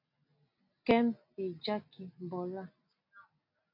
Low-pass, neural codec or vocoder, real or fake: 5.4 kHz; none; real